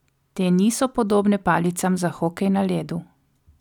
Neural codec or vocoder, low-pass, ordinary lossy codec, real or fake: none; 19.8 kHz; none; real